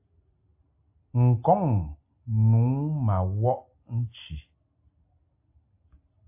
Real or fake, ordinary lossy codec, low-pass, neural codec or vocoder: real; AAC, 32 kbps; 3.6 kHz; none